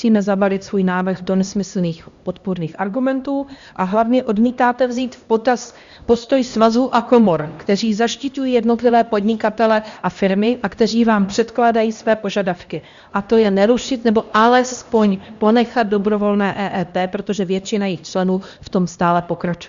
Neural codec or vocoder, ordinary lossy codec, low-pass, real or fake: codec, 16 kHz, 1 kbps, X-Codec, HuBERT features, trained on LibriSpeech; Opus, 64 kbps; 7.2 kHz; fake